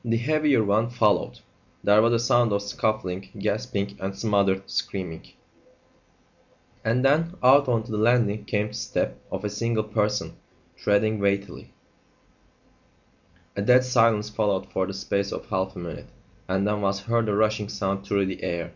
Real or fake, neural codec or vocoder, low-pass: real; none; 7.2 kHz